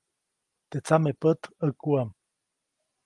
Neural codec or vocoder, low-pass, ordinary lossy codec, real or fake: none; 10.8 kHz; Opus, 24 kbps; real